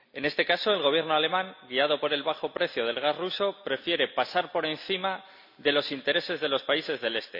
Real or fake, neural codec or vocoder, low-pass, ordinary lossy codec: real; none; 5.4 kHz; none